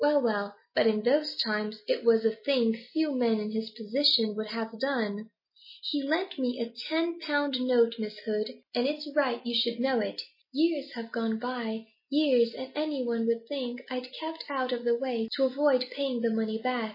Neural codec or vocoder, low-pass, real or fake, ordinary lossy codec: none; 5.4 kHz; real; MP3, 24 kbps